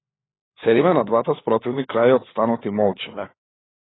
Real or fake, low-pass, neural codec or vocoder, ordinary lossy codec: fake; 7.2 kHz; codec, 16 kHz, 4 kbps, FunCodec, trained on LibriTTS, 50 frames a second; AAC, 16 kbps